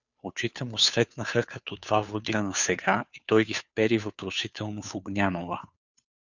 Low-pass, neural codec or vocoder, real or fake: 7.2 kHz; codec, 16 kHz, 2 kbps, FunCodec, trained on Chinese and English, 25 frames a second; fake